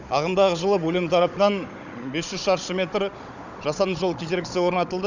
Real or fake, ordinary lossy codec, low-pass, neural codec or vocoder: fake; none; 7.2 kHz; codec, 16 kHz, 16 kbps, FunCodec, trained on Chinese and English, 50 frames a second